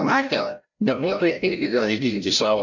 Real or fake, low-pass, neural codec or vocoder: fake; 7.2 kHz; codec, 16 kHz, 0.5 kbps, FreqCodec, larger model